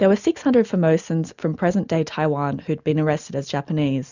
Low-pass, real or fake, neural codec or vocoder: 7.2 kHz; real; none